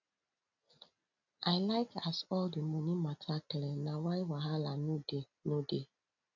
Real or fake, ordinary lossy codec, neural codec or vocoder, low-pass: real; none; none; 7.2 kHz